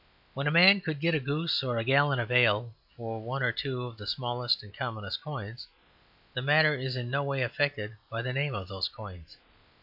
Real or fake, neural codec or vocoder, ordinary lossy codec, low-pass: real; none; AAC, 48 kbps; 5.4 kHz